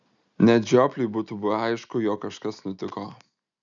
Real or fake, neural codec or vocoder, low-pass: real; none; 7.2 kHz